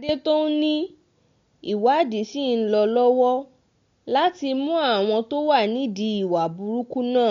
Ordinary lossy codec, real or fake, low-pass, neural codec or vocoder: MP3, 48 kbps; real; 7.2 kHz; none